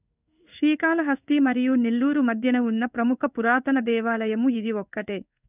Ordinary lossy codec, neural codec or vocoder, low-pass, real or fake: none; codec, 16 kHz in and 24 kHz out, 1 kbps, XY-Tokenizer; 3.6 kHz; fake